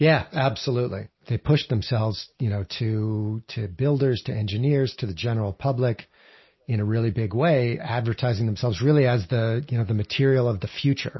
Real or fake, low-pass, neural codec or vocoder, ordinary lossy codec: real; 7.2 kHz; none; MP3, 24 kbps